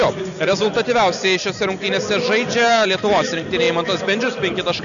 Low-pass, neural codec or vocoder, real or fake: 7.2 kHz; none; real